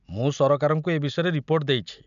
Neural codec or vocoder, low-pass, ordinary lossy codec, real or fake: none; 7.2 kHz; none; real